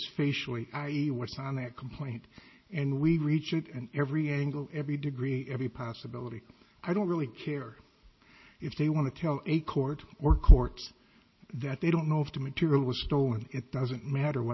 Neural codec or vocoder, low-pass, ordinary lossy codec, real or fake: none; 7.2 kHz; MP3, 24 kbps; real